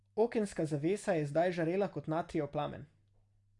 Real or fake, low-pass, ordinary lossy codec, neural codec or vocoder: real; 10.8 kHz; Opus, 64 kbps; none